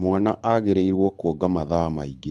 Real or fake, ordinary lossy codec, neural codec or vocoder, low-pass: fake; none; codec, 24 kHz, 6 kbps, HILCodec; none